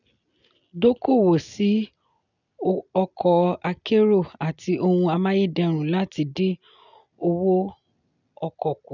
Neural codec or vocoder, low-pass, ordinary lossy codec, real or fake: none; 7.2 kHz; none; real